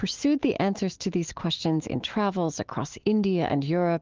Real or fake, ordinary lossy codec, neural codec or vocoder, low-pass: fake; Opus, 16 kbps; autoencoder, 48 kHz, 128 numbers a frame, DAC-VAE, trained on Japanese speech; 7.2 kHz